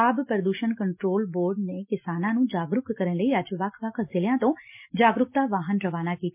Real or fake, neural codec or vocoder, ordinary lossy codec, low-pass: real; none; MP3, 32 kbps; 3.6 kHz